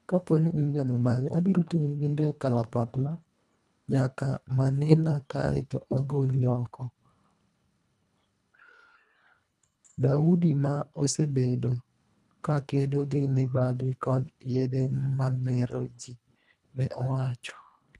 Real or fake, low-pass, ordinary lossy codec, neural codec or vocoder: fake; none; none; codec, 24 kHz, 1.5 kbps, HILCodec